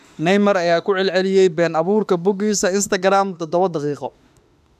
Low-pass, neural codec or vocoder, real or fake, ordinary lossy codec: 14.4 kHz; autoencoder, 48 kHz, 32 numbers a frame, DAC-VAE, trained on Japanese speech; fake; none